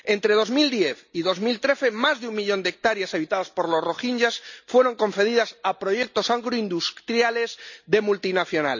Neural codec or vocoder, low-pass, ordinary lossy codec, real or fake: none; 7.2 kHz; none; real